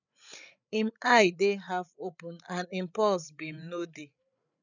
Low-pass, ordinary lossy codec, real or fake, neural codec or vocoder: 7.2 kHz; none; fake; codec, 16 kHz, 8 kbps, FreqCodec, larger model